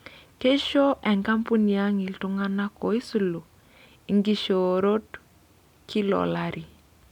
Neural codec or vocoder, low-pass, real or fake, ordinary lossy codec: none; 19.8 kHz; real; none